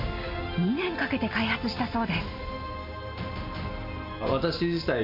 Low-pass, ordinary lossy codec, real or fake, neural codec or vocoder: 5.4 kHz; MP3, 32 kbps; real; none